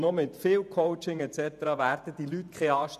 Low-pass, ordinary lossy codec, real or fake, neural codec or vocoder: 14.4 kHz; none; fake; vocoder, 48 kHz, 128 mel bands, Vocos